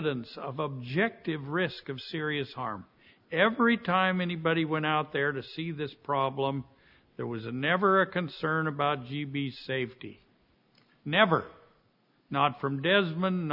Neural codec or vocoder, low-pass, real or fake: none; 5.4 kHz; real